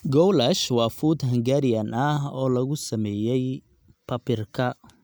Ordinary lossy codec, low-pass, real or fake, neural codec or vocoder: none; none; real; none